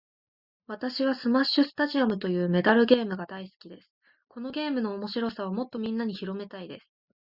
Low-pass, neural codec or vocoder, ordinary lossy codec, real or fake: 5.4 kHz; none; Opus, 64 kbps; real